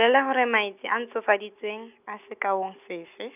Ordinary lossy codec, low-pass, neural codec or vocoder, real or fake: none; 3.6 kHz; none; real